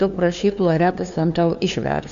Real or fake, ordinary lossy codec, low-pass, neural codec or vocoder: fake; MP3, 96 kbps; 7.2 kHz; codec, 16 kHz, 2 kbps, FunCodec, trained on LibriTTS, 25 frames a second